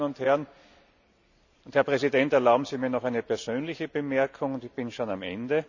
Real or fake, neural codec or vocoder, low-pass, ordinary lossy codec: real; none; 7.2 kHz; none